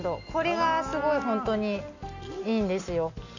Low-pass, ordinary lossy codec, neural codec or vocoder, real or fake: 7.2 kHz; none; none; real